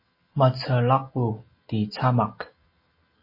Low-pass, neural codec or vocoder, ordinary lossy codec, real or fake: 5.4 kHz; none; MP3, 24 kbps; real